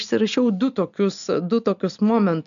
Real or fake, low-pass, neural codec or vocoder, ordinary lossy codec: real; 7.2 kHz; none; MP3, 96 kbps